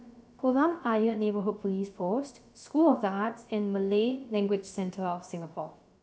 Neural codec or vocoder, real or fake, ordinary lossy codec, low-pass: codec, 16 kHz, about 1 kbps, DyCAST, with the encoder's durations; fake; none; none